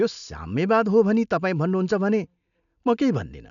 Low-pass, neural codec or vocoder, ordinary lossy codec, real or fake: 7.2 kHz; none; none; real